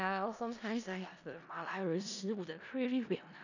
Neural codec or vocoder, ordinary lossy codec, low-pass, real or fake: codec, 16 kHz in and 24 kHz out, 0.4 kbps, LongCat-Audio-Codec, four codebook decoder; none; 7.2 kHz; fake